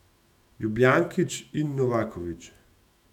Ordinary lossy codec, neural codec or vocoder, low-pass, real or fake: none; autoencoder, 48 kHz, 128 numbers a frame, DAC-VAE, trained on Japanese speech; 19.8 kHz; fake